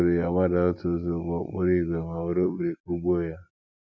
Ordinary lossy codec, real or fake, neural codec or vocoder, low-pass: none; real; none; none